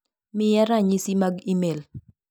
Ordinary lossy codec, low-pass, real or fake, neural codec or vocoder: none; none; real; none